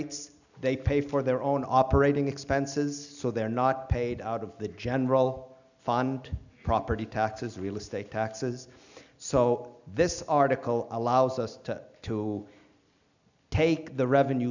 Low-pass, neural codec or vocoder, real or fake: 7.2 kHz; none; real